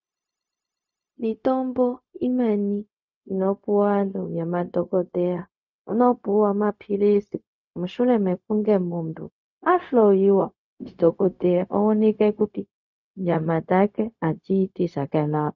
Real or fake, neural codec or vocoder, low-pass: fake; codec, 16 kHz, 0.4 kbps, LongCat-Audio-Codec; 7.2 kHz